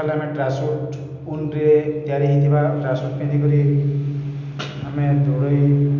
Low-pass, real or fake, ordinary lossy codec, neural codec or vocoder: 7.2 kHz; real; none; none